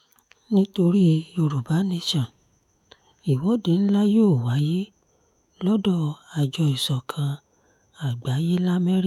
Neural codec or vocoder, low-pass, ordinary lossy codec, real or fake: autoencoder, 48 kHz, 128 numbers a frame, DAC-VAE, trained on Japanese speech; 19.8 kHz; none; fake